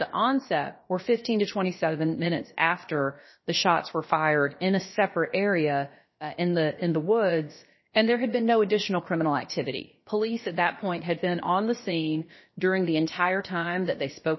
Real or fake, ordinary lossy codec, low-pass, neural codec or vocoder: fake; MP3, 24 kbps; 7.2 kHz; codec, 16 kHz, about 1 kbps, DyCAST, with the encoder's durations